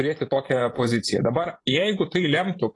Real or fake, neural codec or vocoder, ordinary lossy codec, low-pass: fake; vocoder, 24 kHz, 100 mel bands, Vocos; AAC, 32 kbps; 10.8 kHz